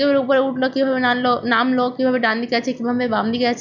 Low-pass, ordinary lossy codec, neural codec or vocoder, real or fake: 7.2 kHz; none; none; real